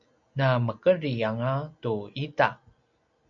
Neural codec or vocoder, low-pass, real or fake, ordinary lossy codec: none; 7.2 kHz; real; MP3, 64 kbps